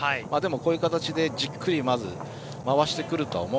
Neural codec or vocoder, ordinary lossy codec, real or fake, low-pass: none; none; real; none